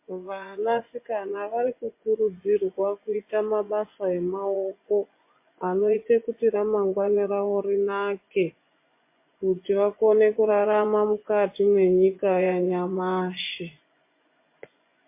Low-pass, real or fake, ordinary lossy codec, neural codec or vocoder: 3.6 kHz; fake; MP3, 24 kbps; vocoder, 24 kHz, 100 mel bands, Vocos